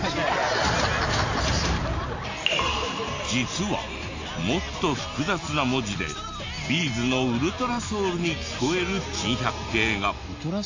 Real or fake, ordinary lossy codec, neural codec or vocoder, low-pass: real; AAC, 48 kbps; none; 7.2 kHz